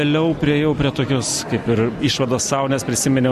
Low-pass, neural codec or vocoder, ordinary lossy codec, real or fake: 14.4 kHz; none; Opus, 64 kbps; real